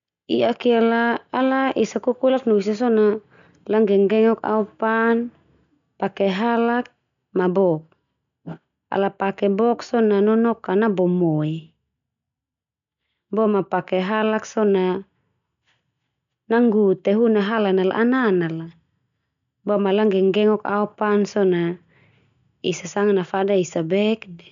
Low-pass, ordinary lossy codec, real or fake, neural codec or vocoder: 7.2 kHz; none; real; none